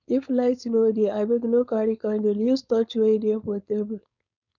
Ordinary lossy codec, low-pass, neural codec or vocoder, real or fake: none; 7.2 kHz; codec, 16 kHz, 4.8 kbps, FACodec; fake